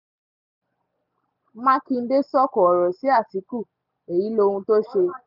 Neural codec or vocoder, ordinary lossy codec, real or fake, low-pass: none; none; real; 5.4 kHz